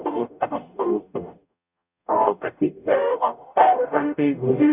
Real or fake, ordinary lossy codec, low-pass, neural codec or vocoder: fake; none; 3.6 kHz; codec, 44.1 kHz, 0.9 kbps, DAC